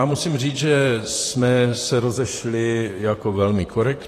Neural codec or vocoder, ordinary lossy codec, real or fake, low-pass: vocoder, 44.1 kHz, 128 mel bands, Pupu-Vocoder; AAC, 48 kbps; fake; 14.4 kHz